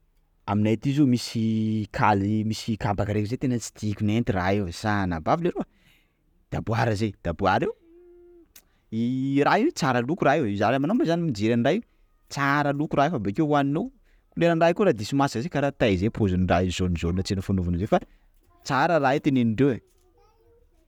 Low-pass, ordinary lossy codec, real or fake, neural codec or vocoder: 19.8 kHz; none; real; none